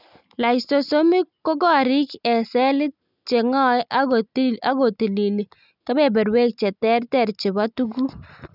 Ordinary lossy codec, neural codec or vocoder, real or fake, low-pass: none; none; real; 5.4 kHz